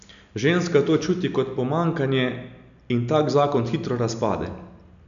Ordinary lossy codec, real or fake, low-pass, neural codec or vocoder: none; real; 7.2 kHz; none